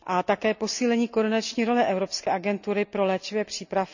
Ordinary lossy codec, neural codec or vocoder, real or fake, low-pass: none; none; real; 7.2 kHz